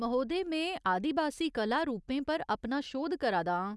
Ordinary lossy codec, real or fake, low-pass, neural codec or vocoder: none; real; none; none